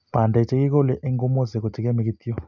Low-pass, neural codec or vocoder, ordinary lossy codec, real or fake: 7.2 kHz; none; none; real